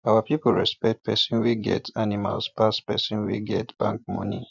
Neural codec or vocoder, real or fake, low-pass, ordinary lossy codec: vocoder, 24 kHz, 100 mel bands, Vocos; fake; 7.2 kHz; none